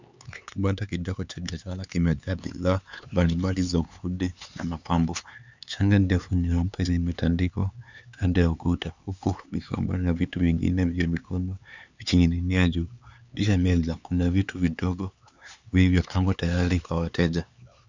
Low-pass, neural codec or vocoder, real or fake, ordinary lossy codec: 7.2 kHz; codec, 16 kHz, 4 kbps, X-Codec, HuBERT features, trained on LibriSpeech; fake; Opus, 64 kbps